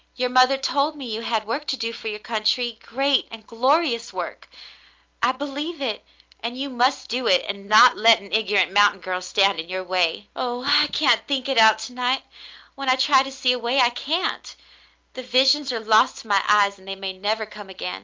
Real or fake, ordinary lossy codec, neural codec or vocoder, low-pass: real; Opus, 32 kbps; none; 7.2 kHz